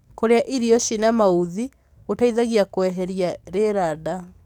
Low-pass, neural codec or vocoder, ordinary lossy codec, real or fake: 19.8 kHz; codec, 44.1 kHz, 7.8 kbps, DAC; none; fake